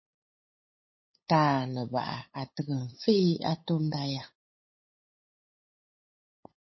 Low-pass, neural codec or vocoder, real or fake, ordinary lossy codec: 7.2 kHz; codec, 16 kHz, 8 kbps, FunCodec, trained on LibriTTS, 25 frames a second; fake; MP3, 24 kbps